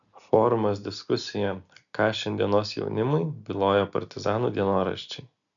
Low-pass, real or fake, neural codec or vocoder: 7.2 kHz; real; none